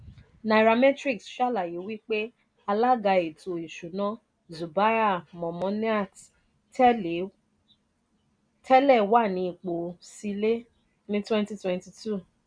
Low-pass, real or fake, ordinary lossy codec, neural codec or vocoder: 9.9 kHz; real; none; none